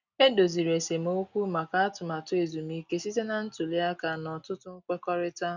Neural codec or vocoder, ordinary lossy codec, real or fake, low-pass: none; none; real; 7.2 kHz